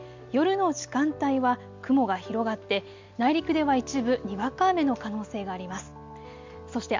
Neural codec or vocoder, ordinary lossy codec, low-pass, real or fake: none; MP3, 64 kbps; 7.2 kHz; real